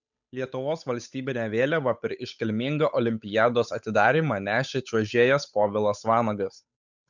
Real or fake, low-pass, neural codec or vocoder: fake; 7.2 kHz; codec, 16 kHz, 8 kbps, FunCodec, trained on Chinese and English, 25 frames a second